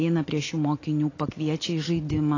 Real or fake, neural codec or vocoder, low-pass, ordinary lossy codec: real; none; 7.2 kHz; AAC, 32 kbps